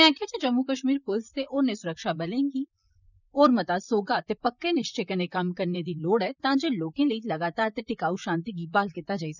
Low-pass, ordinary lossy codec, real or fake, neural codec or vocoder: 7.2 kHz; none; fake; vocoder, 44.1 kHz, 128 mel bands, Pupu-Vocoder